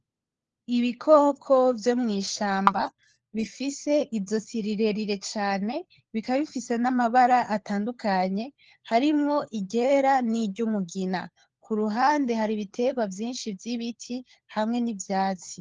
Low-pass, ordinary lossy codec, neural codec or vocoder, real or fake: 7.2 kHz; Opus, 16 kbps; codec, 16 kHz, 4 kbps, FunCodec, trained on LibriTTS, 50 frames a second; fake